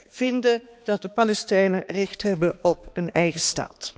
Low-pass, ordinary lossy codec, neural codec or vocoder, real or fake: none; none; codec, 16 kHz, 2 kbps, X-Codec, HuBERT features, trained on balanced general audio; fake